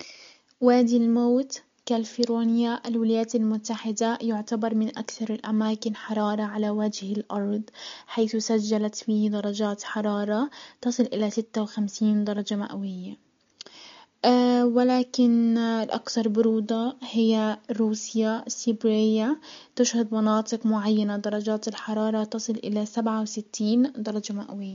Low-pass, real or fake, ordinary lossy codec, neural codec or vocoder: 7.2 kHz; real; MP3, 48 kbps; none